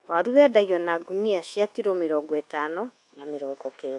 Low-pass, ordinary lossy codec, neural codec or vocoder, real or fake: 10.8 kHz; AAC, 64 kbps; codec, 24 kHz, 1.2 kbps, DualCodec; fake